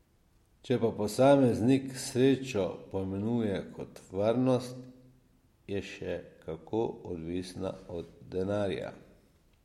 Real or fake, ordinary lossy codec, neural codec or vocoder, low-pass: real; MP3, 64 kbps; none; 19.8 kHz